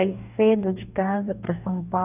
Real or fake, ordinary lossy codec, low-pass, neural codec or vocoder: fake; none; 3.6 kHz; codec, 44.1 kHz, 2.6 kbps, DAC